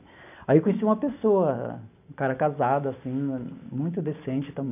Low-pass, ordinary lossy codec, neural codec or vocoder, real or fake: 3.6 kHz; none; none; real